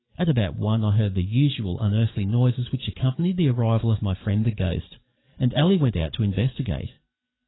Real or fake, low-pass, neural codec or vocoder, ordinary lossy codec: fake; 7.2 kHz; codec, 44.1 kHz, 7.8 kbps, DAC; AAC, 16 kbps